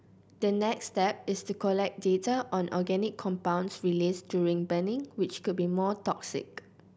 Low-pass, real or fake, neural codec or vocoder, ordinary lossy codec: none; real; none; none